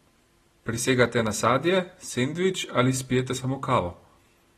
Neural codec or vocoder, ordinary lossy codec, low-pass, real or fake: none; AAC, 32 kbps; 19.8 kHz; real